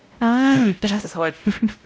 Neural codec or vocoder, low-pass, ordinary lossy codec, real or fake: codec, 16 kHz, 0.5 kbps, X-Codec, WavLM features, trained on Multilingual LibriSpeech; none; none; fake